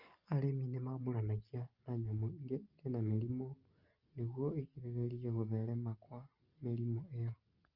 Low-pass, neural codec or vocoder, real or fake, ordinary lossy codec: 5.4 kHz; none; real; Opus, 24 kbps